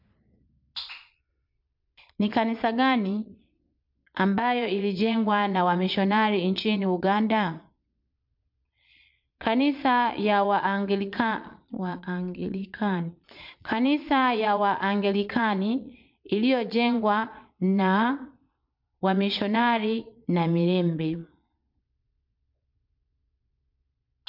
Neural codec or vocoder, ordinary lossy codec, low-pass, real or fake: vocoder, 22.05 kHz, 80 mel bands, Vocos; MP3, 48 kbps; 5.4 kHz; fake